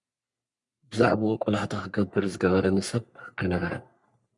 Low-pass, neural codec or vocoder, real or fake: 10.8 kHz; codec, 44.1 kHz, 3.4 kbps, Pupu-Codec; fake